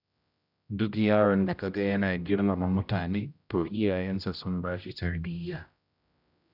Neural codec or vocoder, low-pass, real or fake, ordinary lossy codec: codec, 16 kHz, 0.5 kbps, X-Codec, HuBERT features, trained on general audio; 5.4 kHz; fake; none